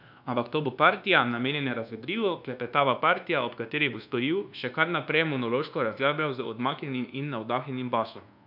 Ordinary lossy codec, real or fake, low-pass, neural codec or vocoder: none; fake; 5.4 kHz; codec, 24 kHz, 1.2 kbps, DualCodec